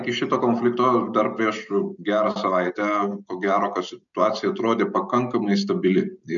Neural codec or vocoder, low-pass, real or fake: none; 7.2 kHz; real